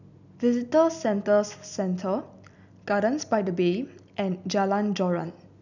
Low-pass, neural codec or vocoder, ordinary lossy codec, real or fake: 7.2 kHz; none; none; real